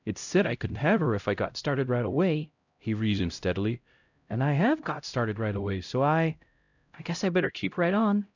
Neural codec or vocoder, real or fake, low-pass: codec, 16 kHz, 0.5 kbps, X-Codec, HuBERT features, trained on LibriSpeech; fake; 7.2 kHz